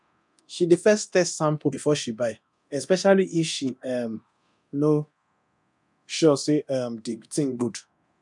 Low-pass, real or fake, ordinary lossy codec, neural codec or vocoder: 10.8 kHz; fake; none; codec, 24 kHz, 0.9 kbps, DualCodec